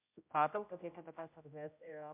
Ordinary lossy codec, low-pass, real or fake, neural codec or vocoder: MP3, 32 kbps; 3.6 kHz; fake; codec, 16 kHz, 0.5 kbps, X-Codec, HuBERT features, trained on general audio